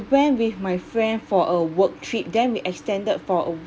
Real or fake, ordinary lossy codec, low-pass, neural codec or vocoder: real; none; none; none